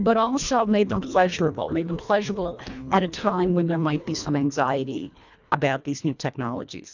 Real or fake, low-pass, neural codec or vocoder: fake; 7.2 kHz; codec, 24 kHz, 1.5 kbps, HILCodec